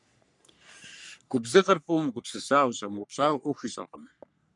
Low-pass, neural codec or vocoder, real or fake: 10.8 kHz; codec, 44.1 kHz, 3.4 kbps, Pupu-Codec; fake